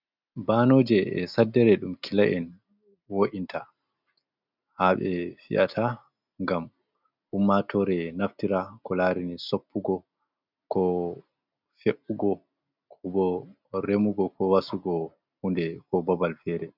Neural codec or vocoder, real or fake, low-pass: none; real; 5.4 kHz